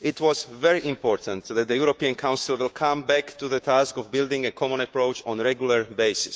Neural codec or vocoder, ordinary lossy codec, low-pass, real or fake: codec, 16 kHz, 6 kbps, DAC; none; none; fake